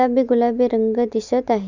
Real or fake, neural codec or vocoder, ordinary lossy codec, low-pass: real; none; none; 7.2 kHz